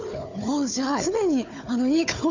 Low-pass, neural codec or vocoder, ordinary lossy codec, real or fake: 7.2 kHz; codec, 16 kHz, 4 kbps, FunCodec, trained on Chinese and English, 50 frames a second; none; fake